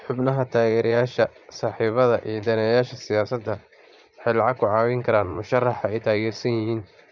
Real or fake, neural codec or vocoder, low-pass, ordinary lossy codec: fake; vocoder, 44.1 kHz, 80 mel bands, Vocos; 7.2 kHz; none